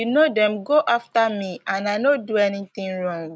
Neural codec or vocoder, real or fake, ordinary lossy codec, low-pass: none; real; none; none